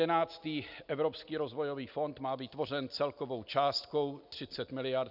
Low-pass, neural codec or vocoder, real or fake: 5.4 kHz; none; real